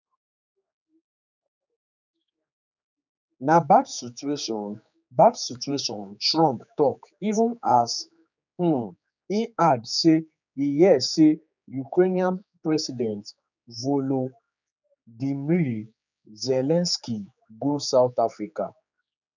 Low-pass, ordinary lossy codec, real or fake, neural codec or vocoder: 7.2 kHz; none; fake; codec, 16 kHz, 4 kbps, X-Codec, HuBERT features, trained on general audio